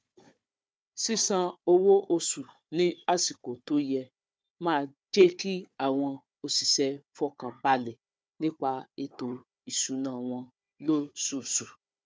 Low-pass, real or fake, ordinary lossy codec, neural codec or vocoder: none; fake; none; codec, 16 kHz, 4 kbps, FunCodec, trained on Chinese and English, 50 frames a second